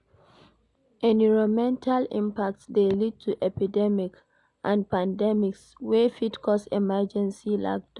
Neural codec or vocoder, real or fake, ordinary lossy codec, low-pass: none; real; none; 10.8 kHz